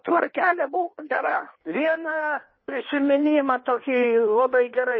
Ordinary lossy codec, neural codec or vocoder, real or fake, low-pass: MP3, 24 kbps; codec, 16 kHz in and 24 kHz out, 1.1 kbps, FireRedTTS-2 codec; fake; 7.2 kHz